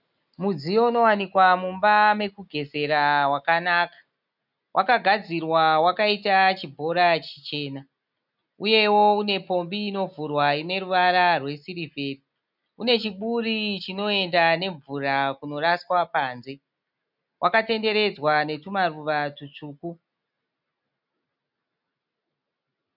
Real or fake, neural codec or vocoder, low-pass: real; none; 5.4 kHz